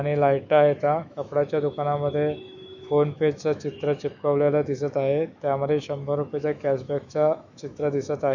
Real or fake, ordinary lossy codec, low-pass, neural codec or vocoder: fake; none; 7.2 kHz; autoencoder, 48 kHz, 128 numbers a frame, DAC-VAE, trained on Japanese speech